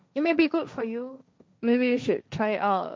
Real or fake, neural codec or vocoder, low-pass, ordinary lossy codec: fake; codec, 16 kHz, 1.1 kbps, Voila-Tokenizer; none; none